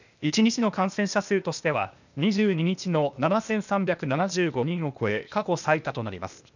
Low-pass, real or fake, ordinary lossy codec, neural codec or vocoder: 7.2 kHz; fake; none; codec, 16 kHz, 0.8 kbps, ZipCodec